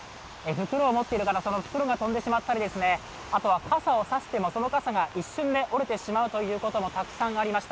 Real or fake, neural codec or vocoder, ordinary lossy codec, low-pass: real; none; none; none